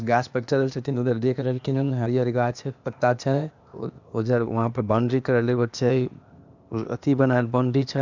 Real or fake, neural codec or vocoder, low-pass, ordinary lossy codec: fake; codec, 16 kHz, 0.8 kbps, ZipCodec; 7.2 kHz; none